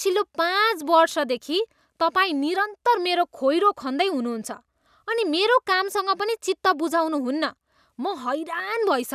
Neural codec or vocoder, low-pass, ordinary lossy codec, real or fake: none; 14.4 kHz; none; real